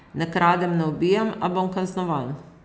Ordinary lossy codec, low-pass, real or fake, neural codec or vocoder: none; none; real; none